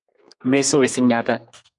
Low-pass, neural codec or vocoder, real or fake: 10.8 kHz; codec, 32 kHz, 1.9 kbps, SNAC; fake